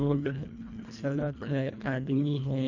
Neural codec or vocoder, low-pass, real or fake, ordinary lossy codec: codec, 24 kHz, 1.5 kbps, HILCodec; 7.2 kHz; fake; none